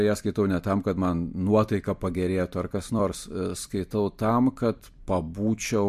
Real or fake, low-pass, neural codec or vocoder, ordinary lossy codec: fake; 14.4 kHz; vocoder, 44.1 kHz, 128 mel bands every 256 samples, BigVGAN v2; MP3, 64 kbps